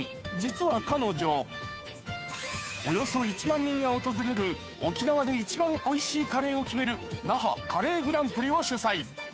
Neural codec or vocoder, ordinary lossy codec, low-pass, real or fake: codec, 16 kHz, 2 kbps, FunCodec, trained on Chinese and English, 25 frames a second; none; none; fake